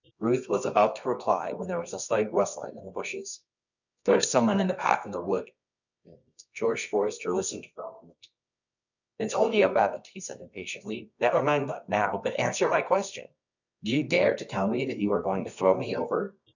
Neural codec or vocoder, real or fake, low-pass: codec, 24 kHz, 0.9 kbps, WavTokenizer, medium music audio release; fake; 7.2 kHz